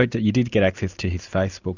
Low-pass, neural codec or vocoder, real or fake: 7.2 kHz; none; real